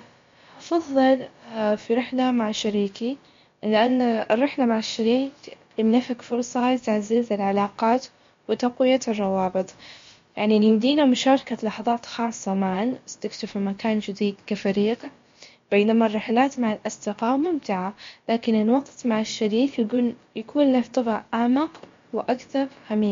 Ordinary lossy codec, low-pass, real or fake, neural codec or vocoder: MP3, 48 kbps; 7.2 kHz; fake; codec, 16 kHz, about 1 kbps, DyCAST, with the encoder's durations